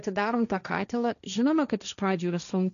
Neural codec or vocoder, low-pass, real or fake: codec, 16 kHz, 1.1 kbps, Voila-Tokenizer; 7.2 kHz; fake